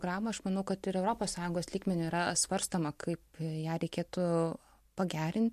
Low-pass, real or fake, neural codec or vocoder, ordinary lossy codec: 14.4 kHz; real; none; MP3, 64 kbps